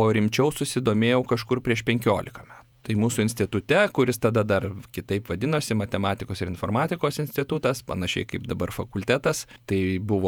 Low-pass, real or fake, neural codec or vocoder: 19.8 kHz; fake; vocoder, 48 kHz, 128 mel bands, Vocos